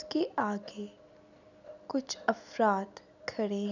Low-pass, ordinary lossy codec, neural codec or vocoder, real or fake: 7.2 kHz; none; none; real